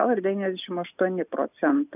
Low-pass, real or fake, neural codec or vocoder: 3.6 kHz; real; none